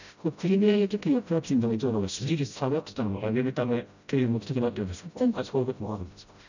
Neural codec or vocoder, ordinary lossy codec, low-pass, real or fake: codec, 16 kHz, 0.5 kbps, FreqCodec, smaller model; none; 7.2 kHz; fake